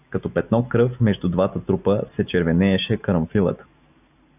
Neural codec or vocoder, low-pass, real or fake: none; 3.6 kHz; real